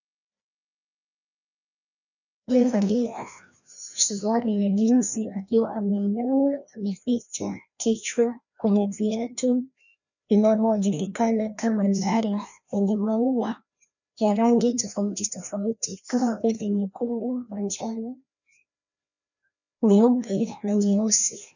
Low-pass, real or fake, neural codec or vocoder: 7.2 kHz; fake; codec, 16 kHz, 1 kbps, FreqCodec, larger model